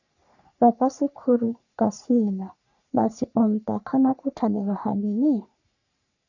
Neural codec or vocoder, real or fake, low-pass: codec, 44.1 kHz, 3.4 kbps, Pupu-Codec; fake; 7.2 kHz